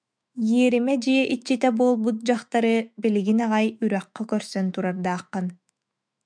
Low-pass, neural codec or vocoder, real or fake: 9.9 kHz; autoencoder, 48 kHz, 128 numbers a frame, DAC-VAE, trained on Japanese speech; fake